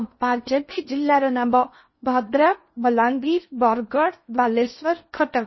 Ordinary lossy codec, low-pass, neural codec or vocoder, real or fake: MP3, 24 kbps; 7.2 kHz; codec, 16 kHz in and 24 kHz out, 0.6 kbps, FocalCodec, streaming, 2048 codes; fake